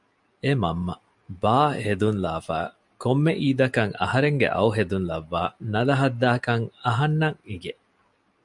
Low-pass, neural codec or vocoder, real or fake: 10.8 kHz; none; real